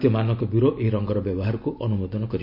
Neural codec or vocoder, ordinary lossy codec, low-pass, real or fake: none; MP3, 48 kbps; 5.4 kHz; real